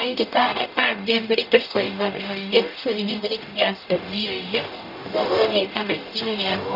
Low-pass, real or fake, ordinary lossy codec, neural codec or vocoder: 5.4 kHz; fake; none; codec, 44.1 kHz, 0.9 kbps, DAC